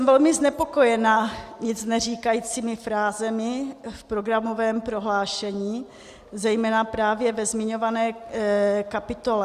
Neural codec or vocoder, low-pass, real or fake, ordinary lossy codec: none; 14.4 kHz; real; Opus, 64 kbps